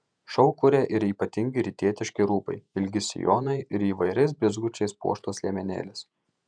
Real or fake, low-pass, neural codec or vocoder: fake; 9.9 kHz; vocoder, 48 kHz, 128 mel bands, Vocos